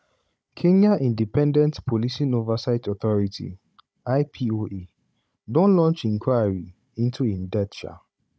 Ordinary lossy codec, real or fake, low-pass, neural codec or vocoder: none; fake; none; codec, 16 kHz, 16 kbps, FunCodec, trained on Chinese and English, 50 frames a second